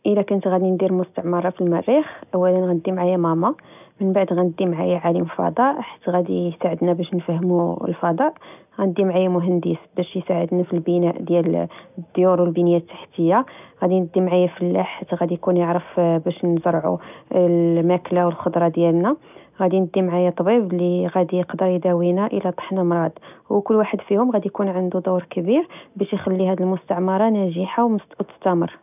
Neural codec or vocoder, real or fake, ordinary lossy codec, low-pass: none; real; none; 3.6 kHz